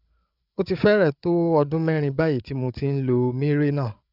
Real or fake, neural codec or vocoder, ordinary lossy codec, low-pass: fake; codec, 16 kHz, 8 kbps, FreqCodec, larger model; none; 5.4 kHz